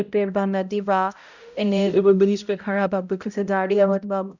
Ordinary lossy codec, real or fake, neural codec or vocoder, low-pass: none; fake; codec, 16 kHz, 0.5 kbps, X-Codec, HuBERT features, trained on balanced general audio; 7.2 kHz